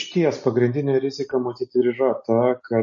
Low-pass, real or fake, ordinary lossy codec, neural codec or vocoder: 7.2 kHz; real; MP3, 32 kbps; none